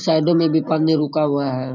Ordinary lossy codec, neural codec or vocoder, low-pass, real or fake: none; none; 7.2 kHz; real